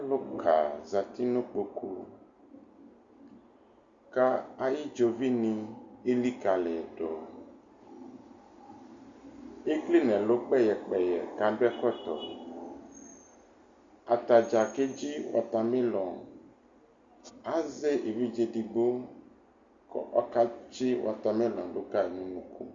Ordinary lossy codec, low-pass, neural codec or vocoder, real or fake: Opus, 64 kbps; 7.2 kHz; none; real